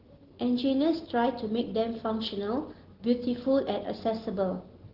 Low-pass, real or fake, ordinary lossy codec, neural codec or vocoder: 5.4 kHz; real; Opus, 16 kbps; none